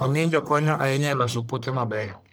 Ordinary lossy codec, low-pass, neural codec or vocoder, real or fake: none; none; codec, 44.1 kHz, 1.7 kbps, Pupu-Codec; fake